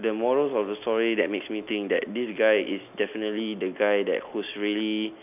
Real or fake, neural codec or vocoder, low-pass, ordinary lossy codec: real; none; 3.6 kHz; none